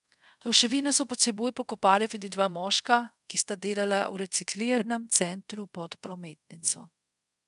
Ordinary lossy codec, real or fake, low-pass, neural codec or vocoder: MP3, 96 kbps; fake; 10.8 kHz; codec, 24 kHz, 0.5 kbps, DualCodec